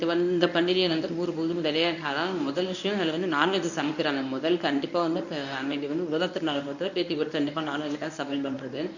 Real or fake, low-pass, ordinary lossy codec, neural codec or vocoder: fake; 7.2 kHz; none; codec, 24 kHz, 0.9 kbps, WavTokenizer, medium speech release version 1